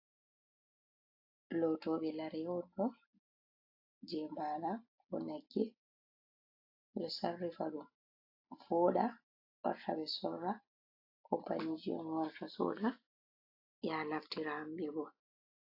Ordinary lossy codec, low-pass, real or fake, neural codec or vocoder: AAC, 32 kbps; 5.4 kHz; real; none